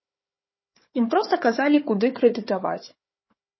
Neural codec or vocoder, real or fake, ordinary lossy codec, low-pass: codec, 16 kHz, 4 kbps, FunCodec, trained on Chinese and English, 50 frames a second; fake; MP3, 24 kbps; 7.2 kHz